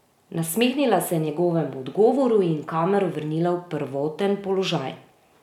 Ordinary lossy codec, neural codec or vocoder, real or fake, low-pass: none; none; real; 19.8 kHz